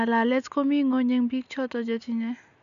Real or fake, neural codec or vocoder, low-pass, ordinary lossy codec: real; none; 7.2 kHz; none